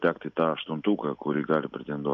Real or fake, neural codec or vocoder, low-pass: real; none; 7.2 kHz